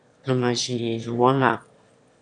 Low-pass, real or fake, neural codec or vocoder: 9.9 kHz; fake; autoencoder, 22.05 kHz, a latent of 192 numbers a frame, VITS, trained on one speaker